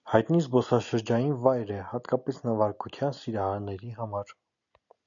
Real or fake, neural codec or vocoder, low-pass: real; none; 7.2 kHz